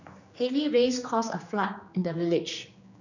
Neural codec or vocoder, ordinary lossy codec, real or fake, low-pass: codec, 16 kHz, 2 kbps, X-Codec, HuBERT features, trained on general audio; none; fake; 7.2 kHz